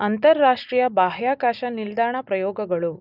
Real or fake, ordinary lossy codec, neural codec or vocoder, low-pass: real; none; none; 5.4 kHz